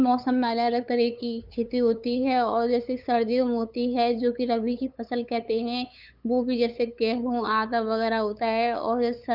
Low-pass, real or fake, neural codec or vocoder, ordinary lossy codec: 5.4 kHz; fake; codec, 16 kHz, 8 kbps, FunCodec, trained on LibriTTS, 25 frames a second; none